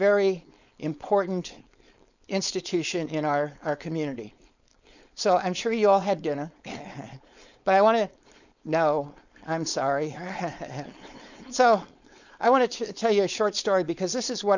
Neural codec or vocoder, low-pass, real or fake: codec, 16 kHz, 4.8 kbps, FACodec; 7.2 kHz; fake